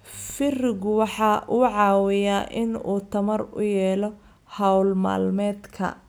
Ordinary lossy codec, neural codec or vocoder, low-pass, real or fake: none; none; none; real